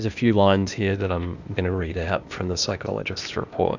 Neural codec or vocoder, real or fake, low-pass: codec, 16 kHz, 0.8 kbps, ZipCodec; fake; 7.2 kHz